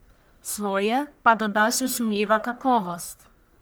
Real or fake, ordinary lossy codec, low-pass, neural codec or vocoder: fake; none; none; codec, 44.1 kHz, 1.7 kbps, Pupu-Codec